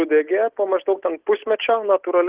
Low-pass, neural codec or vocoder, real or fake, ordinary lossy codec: 3.6 kHz; none; real; Opus, 16 kbps